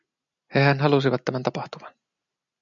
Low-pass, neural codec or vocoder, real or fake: 7.2 kHz; none; real